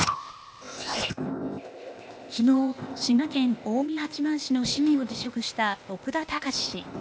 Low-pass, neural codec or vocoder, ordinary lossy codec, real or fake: none; codec, 16 kHz, 0.8 kbps, ZipCodec; none; fake